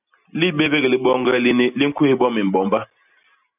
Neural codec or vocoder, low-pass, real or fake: none; 3.6 kHz; real